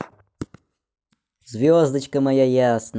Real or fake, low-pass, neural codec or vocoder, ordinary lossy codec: real; none; none; none